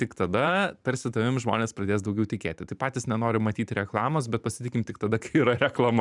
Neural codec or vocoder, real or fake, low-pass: vocoder, 44.1 kHz, 128 mel bands every 512 samples, BigVGAN v2; fake; 10.8 kHz